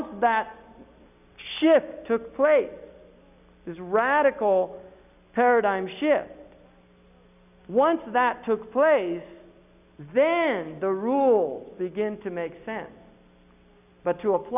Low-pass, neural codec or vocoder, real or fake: 3.6 kHz; none; real